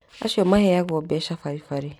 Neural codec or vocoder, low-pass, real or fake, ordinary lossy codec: none; 19.8 kHz; real; none